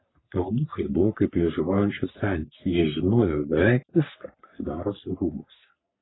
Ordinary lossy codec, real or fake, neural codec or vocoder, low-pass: AAC, 16 kbps; fake; codec, 44.1 kHz, 3.4 kbps, Pupu-Codec; 7.2 kHz